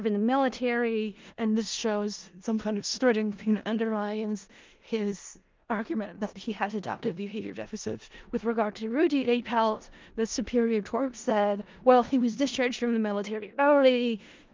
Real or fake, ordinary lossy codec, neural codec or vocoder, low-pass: fake; Opus, 24 kbps; codec, 16 kHz in and 24 kHz out, 0.4 kbps, LongCat-Audio-Codec, four codebook decoder; 7.2 kHz